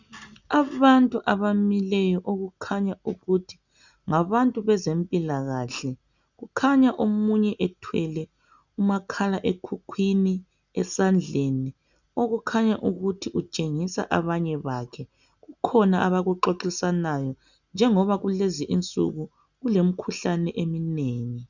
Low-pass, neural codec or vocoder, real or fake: 7.2 kHz; none; real